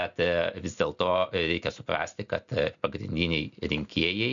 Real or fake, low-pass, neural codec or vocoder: real; 7.2 kHz; none